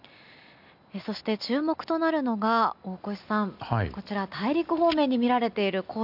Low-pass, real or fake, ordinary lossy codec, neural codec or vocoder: 5.4 kHz; real; none; none